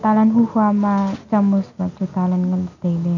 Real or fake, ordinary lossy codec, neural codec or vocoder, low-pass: real; none; none; 7.2 kHz